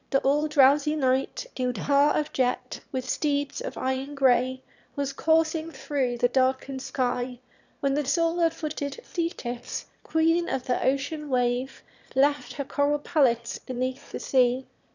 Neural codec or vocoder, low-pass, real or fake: autoencoder, 22.05 kHz, a latent of 192 numbers a frame, VITS, trained on one speaker; 7.2 kHz; fake